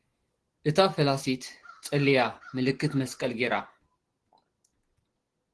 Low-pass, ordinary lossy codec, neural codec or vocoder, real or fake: 10.8 kHz; Opus, 16 kbps; none; real